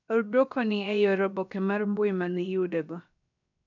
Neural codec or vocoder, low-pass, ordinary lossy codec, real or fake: codec, 16 kHz, 0.7 kbps, FocalCodec; 7.2 kHz; none; fake